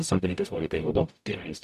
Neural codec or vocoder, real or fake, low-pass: codec, 44.1 kHz, 0.9 kbps, DAC; fake; 14.4 kHz